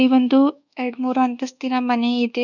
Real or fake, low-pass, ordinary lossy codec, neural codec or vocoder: fake; 7.2 kHz; none; codec, 24 kHz, 1.2 kbps, DualCodec